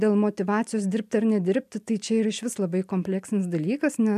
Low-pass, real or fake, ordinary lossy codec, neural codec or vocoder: 14.4 kHz; real; MP3, 96 kbps; none